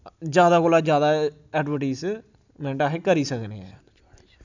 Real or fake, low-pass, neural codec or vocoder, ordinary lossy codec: real; 7.2 kHz; none; none